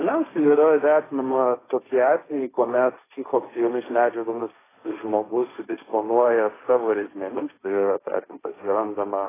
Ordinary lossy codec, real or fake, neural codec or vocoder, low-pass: AAC, 16 kbps; fake; codec, 16 kHz, 1.1 kbps, Voila-Tokenizer; 3.6 kHz